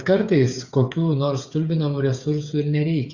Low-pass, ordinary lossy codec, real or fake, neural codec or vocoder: 7.2 kHz; Opus, 64 kbps; fake; codec, 16 kHz, 8 kbps, FreqCodec, smaller model